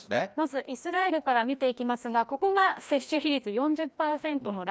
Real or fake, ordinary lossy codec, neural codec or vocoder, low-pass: fake; none; codec, 16 kHz, 1 kbps, FreqCodec, larger model; none